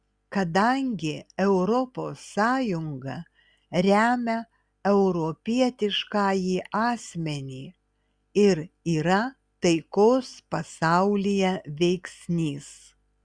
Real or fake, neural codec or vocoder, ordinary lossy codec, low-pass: real; none; Opus, 64 kbps; 9.9 kHz